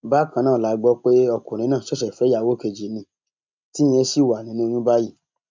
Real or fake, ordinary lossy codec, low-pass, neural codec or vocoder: real; MP3, 64 kbps; 7.2 kHz; none